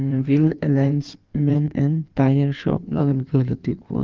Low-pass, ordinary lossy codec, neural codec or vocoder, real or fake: 7.2 kHz; Opus, 32 kbps; codec, 16 kHz in and 24 kHz out, 1.1 kbps, FireRedTTS-2 codec; fake